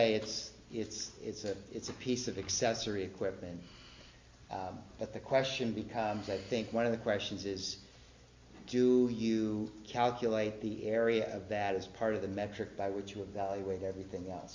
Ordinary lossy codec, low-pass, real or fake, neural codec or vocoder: MP3, 48 kbps; 7.2 kHz; real; none